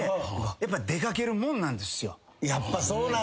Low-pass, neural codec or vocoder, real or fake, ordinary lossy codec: none; none; real; none